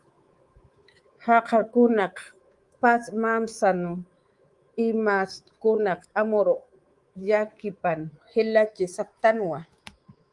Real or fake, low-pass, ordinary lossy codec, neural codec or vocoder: fake; 10.8 kHz; Opus, 24 kbps; codec, 24 kHz, 3.1 kbps, DualCodec